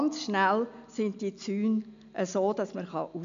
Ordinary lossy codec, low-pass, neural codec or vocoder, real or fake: AAC, 96 kbps; 7.2 kHz; none; real